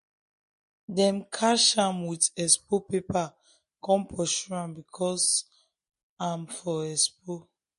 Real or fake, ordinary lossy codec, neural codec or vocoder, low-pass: real; MP3, 48 kbps; none; 14.4 kHz